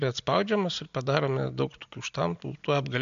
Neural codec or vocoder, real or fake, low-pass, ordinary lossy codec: none; real; 7.2 kHz; MP3, 64 kbps